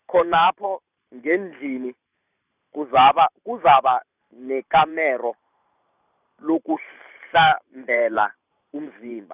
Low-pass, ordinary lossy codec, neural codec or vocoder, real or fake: 3.6 kHz; none; vocoder, 44.1 kHz, 128 mel bands every 512 samples, BigVGAN v2; fake